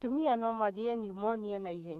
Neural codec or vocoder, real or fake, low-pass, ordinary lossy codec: codec, 32 kHz, 1.9 kbps, SNAC; fake; 14.4 kHz; none